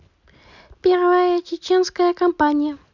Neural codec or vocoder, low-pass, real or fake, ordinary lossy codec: none; 7.2 kHz; real; none